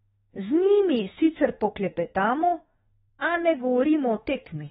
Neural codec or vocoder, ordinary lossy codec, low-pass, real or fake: autoencoder, 48 kHz, 32 numbers a frame, DAC-VAE, trained on Japanese speech; AAC, 16 kbps; 19.8 kHz; fake